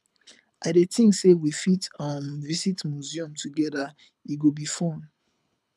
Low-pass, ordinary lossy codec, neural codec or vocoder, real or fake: none; none; codec, 24 kHz, 6 kbps, HILCodec; fake